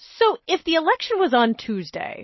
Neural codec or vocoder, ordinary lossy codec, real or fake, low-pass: none; MP3, 24 kbps; real; 7.2 kHz